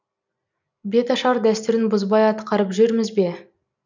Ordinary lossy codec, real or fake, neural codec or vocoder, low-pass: none; real; none; 7.2 kHz